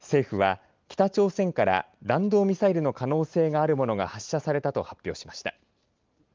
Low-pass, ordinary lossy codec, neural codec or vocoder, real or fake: 7.2 kHz; Opus, 32 kbps; none; real